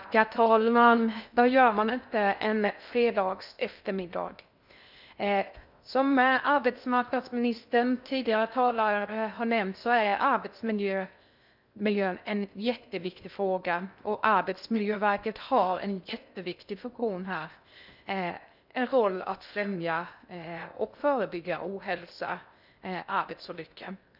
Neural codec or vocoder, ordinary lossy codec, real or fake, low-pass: codec, 16 kHz in and 24 kHz out, 0.6 kbps, FocalCodec, streaming, 2048 codes; none; fake; 5.4 kHz